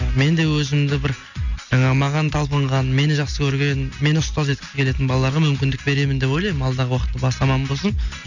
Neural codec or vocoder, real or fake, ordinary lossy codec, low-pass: none; real; none; 7.2 kHz